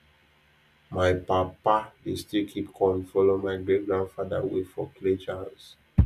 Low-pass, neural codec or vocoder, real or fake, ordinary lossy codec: 14.4 kHz; none; real; none